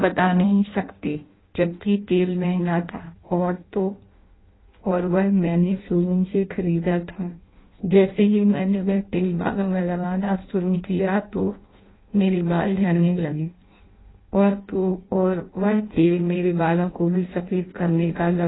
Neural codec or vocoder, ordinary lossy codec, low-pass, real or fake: codec, 16 kHz in and 24 kHz out, 0.6 kbps, FireRedTTS-2 codec; AAC, 16 kbps; 7.2 kHz; fake